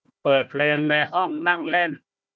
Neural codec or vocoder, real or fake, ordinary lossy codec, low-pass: codec, 16 kHz, 1 kbps, FunCodec, trained on Chinese and English, 50 frames a second; fake; none; none